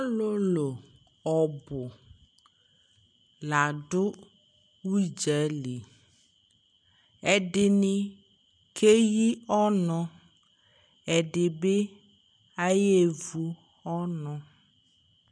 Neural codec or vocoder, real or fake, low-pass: none; real; 9.9 kHz